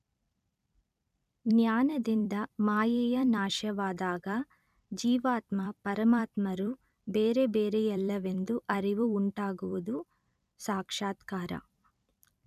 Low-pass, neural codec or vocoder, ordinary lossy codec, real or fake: 14.4 kHz; vocoder, 44.1 kHz, 128 mel bands every 256 samples, BigVGAN v2; none; fake